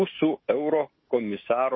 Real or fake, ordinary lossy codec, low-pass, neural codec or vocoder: real; MP3, 24 kbps; 7.2 kHz; none